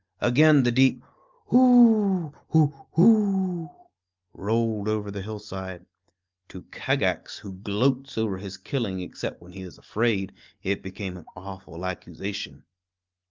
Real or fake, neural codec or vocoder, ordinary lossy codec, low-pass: real; none; Opus, 24 kbps; 7.2 kHz